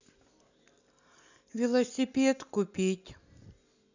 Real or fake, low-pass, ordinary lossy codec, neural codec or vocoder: real; 7.2 kHz; none; none